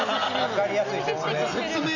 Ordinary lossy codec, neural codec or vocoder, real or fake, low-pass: none; none; real; 7.2 kHz